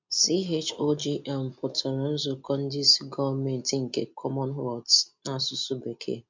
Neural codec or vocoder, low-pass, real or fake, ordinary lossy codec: none; 7.2 kHz; real; MP3, 48 kbps